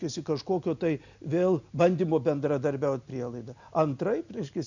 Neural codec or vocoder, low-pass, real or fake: none; 7.2 kHz; real